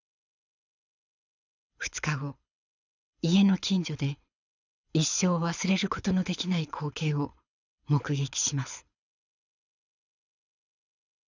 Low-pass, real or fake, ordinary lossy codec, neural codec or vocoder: 7.2 kHz; fake; none; codec, 24 kHz, 6 kbps, HILCodec